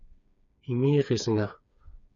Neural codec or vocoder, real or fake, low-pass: codec, 16 kHz, 4 kbps, FreqCodec, smaller model; fake; 7.2 kHz